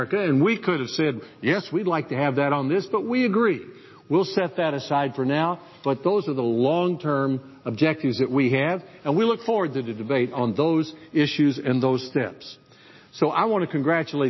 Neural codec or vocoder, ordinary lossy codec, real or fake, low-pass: none; MP3, 24 kbps; real; 7.2 kHz